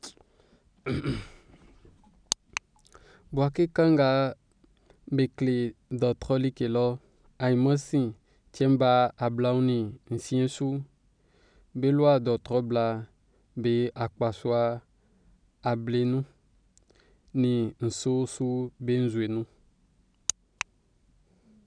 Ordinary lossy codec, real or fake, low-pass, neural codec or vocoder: none; real; 9.9 kHz; none